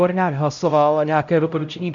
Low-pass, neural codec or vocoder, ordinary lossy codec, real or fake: 7.2 kHz; codec, 16 kHz, 0.5 kbps, X-Codec, HuBERT features, trained on LibriSpeech; MP3, 96 kbps; fake